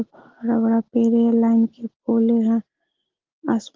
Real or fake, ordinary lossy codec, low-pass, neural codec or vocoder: real; Opus, 16 kbps; 7.2 kHz; none